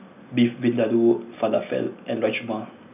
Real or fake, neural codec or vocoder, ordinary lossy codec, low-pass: real; none; none; 3.6 kHz